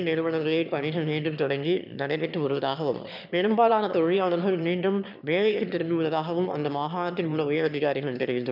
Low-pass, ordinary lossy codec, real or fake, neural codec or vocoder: 5.4 kHz; none; fake; autoencoder, 22.05 kHz, a latent of 192 numbers a frame, VITS, trained on one speaker